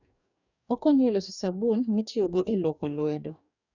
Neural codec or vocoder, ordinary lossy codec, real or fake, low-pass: codec, 44.1 kHz, 2.6 kbps, DAC; none; fake; 7.2 kHz